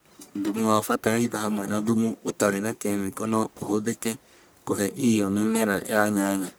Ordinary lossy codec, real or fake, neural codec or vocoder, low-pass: none; fake; codec, 44.1 kHz, 1.7 kbps, Pupu-Codec; none